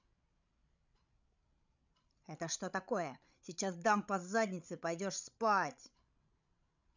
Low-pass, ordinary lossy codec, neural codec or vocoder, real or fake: 7.2 kHz; none; codec, 16 kHz, 16 kbps, FreqCodec, larger model; fake